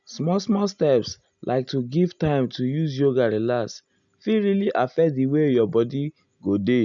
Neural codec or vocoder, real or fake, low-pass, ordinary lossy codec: none; real; 7.2 kHz; none